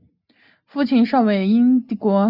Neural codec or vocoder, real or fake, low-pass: none; real; 5.4 kHz